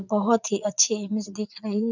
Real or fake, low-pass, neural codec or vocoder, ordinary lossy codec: fake; 7.2 kHz; vocoder, 44.1 kHz, 80 mel bands, Vocos; none